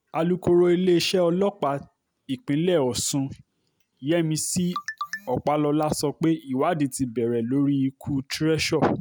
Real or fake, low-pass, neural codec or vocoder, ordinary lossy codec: real; none; none; none